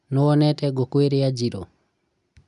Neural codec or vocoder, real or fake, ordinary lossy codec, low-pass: none; real; none; 10.8 kHz